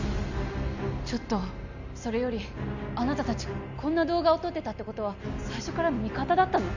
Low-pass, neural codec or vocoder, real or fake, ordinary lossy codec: 7.2 kHz; none; real; none